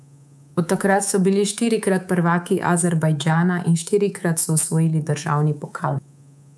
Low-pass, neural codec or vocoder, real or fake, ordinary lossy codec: none; codec, 24 kHz, 3.1 kbps, DualCodec; fake; none